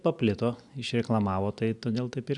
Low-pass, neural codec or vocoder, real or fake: 10.8 kHz; none; real